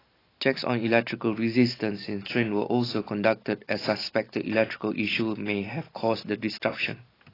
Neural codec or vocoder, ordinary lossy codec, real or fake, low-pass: none; AAC, 24 kbps; real; 5.4 kHz